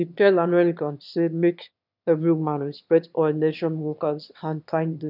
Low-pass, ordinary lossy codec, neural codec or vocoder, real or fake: 5.4 kHz; none; autoencoder, 22.05 kHz, a latent of 192 numbers a frame, VITS, trained on one speaker; fake